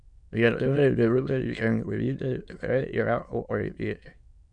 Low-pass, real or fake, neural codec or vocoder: 9.9 kHz; fake; autoencoder, 22.05 kHz, a latent of 192 numbers a frame, VITS, trained on many speakers